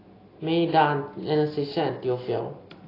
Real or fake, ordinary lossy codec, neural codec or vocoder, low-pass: real; AAC, 24 kbps; none; 5.4 kHz